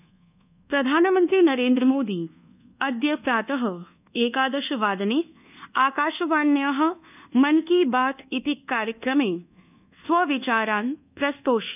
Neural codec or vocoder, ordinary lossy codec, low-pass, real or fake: codec, 24 kHz, 1.2 kbps, DualCodec; none; 3.6 kHz; fake